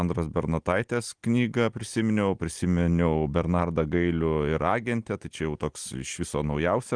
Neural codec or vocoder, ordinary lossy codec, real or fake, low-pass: none; Opus, 24 kbps; real; 9.9 kHz